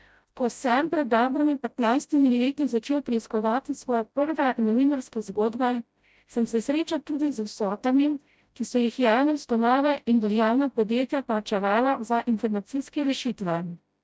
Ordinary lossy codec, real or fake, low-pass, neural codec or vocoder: none; fake; none; codec, 16 kHz, 0.5 kbps, FreqCodec, smaller model